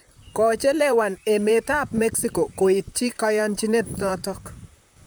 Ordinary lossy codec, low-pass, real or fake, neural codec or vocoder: none; none; fake; vocoder, 44.1 kHz, 128 mel bands, Pupu-Vocoder